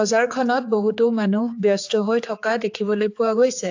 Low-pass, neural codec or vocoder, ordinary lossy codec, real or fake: 7.2 kHz; codec, 16 kHz, 4 kbps, X-Codec, HuBERT features, trained on general audio; AAC, 48 kbps; fake